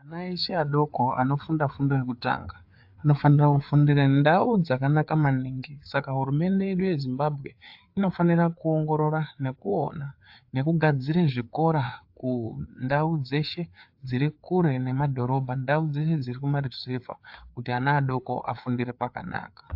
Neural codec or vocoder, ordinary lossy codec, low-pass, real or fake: codec, 16 kHz, 4 kbps, FreqCodec, larger model; AAC, 48 kbps; 5.4 kHz; fake